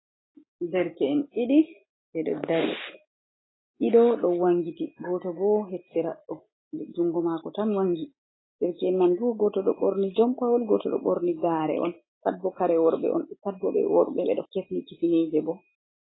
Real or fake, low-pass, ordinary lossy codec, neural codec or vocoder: real; 7.2 kHz; AAC, 16 kbps; none